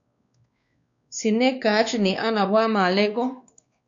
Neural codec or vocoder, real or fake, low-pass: codec, 16 kHz, 2 kbps, X-Codec, WavLM features, trained on Multilingual LibriSpeech; fake; 7.2 kHz